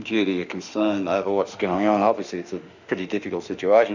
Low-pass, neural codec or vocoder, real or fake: 7.2 kHz; autoencoder, 48 kHz, 32 numbers a frame, DAC-VAE, trained on Japanese speech; fake